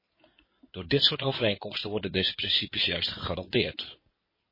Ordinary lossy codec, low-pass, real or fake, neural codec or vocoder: MP3, 24 kbps; 5.4 kHz; fake; codec, 16 kHz in and 24 kHz out, 2.2 kbps, FireRedTTS-2 codec